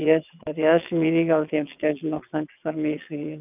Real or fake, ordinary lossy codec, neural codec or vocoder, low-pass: fake; none; vocoder, 22.05 kHz, 80 mel bands, WaveNeXt; 3.6 kHz